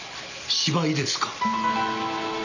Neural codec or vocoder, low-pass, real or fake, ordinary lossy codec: none; 7.2 kHz; real; none